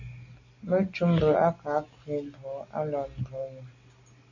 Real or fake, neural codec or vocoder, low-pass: real; none; 7.2 kHz